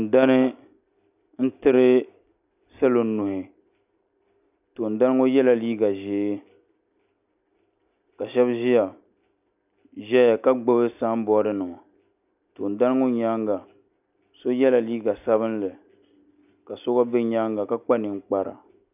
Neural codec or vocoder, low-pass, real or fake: none; 3.6 kHz; real